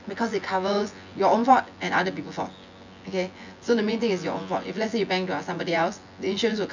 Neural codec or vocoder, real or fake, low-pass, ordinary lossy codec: vocoder, 24 kHz, 100 mel bands, Vocos; fake; 7.2 kHz; none